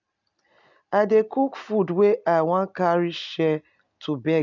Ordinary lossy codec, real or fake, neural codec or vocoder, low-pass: none; real; none; 7.2 kHz